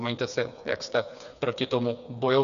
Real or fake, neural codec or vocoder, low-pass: fake; codec, 16 kHz, 4 kbps, FreqCodec, smaller model; 7.2 kHz